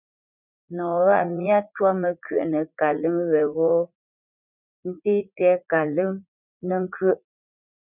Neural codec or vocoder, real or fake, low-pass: vocoder, 44.1 kHz, 80 mel bands, Vocos; fake; 3.6 kHz